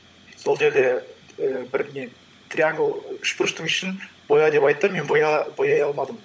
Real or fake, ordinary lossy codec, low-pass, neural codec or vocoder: fake; none; none; codec, 16 kHz, 16 kbps, FunCodec, trained on LibriTTS, 50 frames a second